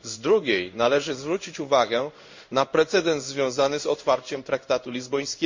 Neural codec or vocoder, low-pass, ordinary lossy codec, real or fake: codec, 16 kHz in and 24 kHz out, 1 kbps, XY-Tokenizer; 7.2 kHz; MP3, 64 kbps; fake